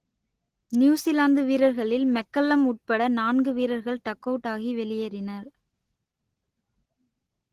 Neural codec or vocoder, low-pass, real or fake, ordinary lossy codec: none; 14.4 kHz; real; Opus, 16 kbps